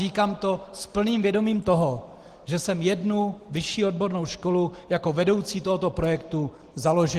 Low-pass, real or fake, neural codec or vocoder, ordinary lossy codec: 14.4 kHz; real; none; Opus, 16 kbps